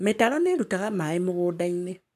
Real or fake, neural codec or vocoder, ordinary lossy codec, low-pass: fake; codec, 44.1 kHz, 7.8 kbps, Pupu-Codec; none; 14.4 kHz